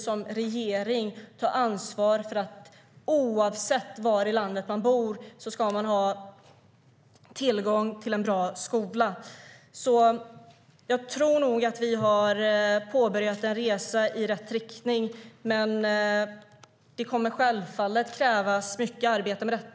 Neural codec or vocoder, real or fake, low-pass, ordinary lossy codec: none; real; none; none